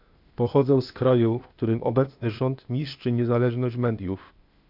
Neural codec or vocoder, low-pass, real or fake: codec, 16 kHz, 0.8 kbps, ZipCodec; 5.4 kHz; fake